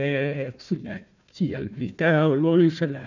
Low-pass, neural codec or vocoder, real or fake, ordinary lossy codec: 7.2 kHz; codec, 16 kHz, 1 kbps, FunCodec, trained on Chinese and English, 50 frames a second; fake; none